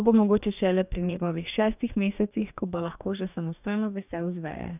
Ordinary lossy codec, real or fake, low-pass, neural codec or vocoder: none; fake; 3.6 kHz; codec, 44.1 kHz, 2.6 kbps, DAC